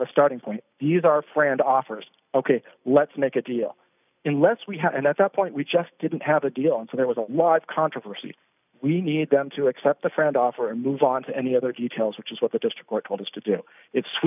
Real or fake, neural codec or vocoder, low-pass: real; none; 3.6 kHz